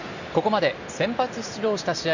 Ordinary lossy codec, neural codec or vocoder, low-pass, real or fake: none; none; 7.2 kHz; real